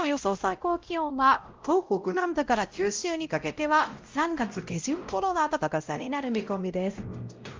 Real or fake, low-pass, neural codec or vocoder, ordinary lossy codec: fake; 7.2 kHz; codec, 16 kHz, 0.5 kbps, X-Codec, WavLM features, trained on Multilingual LibriSpeech; Opus, 32 kbps